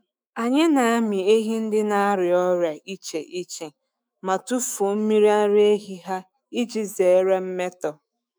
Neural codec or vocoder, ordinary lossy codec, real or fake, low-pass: autoencoder, 48 kHz, 128 numbers a frame, DAC-VAE, trained on Japanese speech; none; fake; none